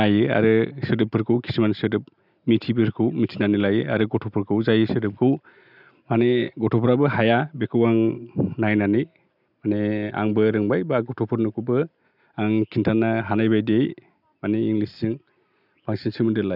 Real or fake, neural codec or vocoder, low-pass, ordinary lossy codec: real; none; 5.4 kHz; none